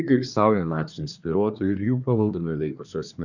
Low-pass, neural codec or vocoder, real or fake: 7.2 kHz; codec, 24 kHz, 1 kbps, SNAC; fake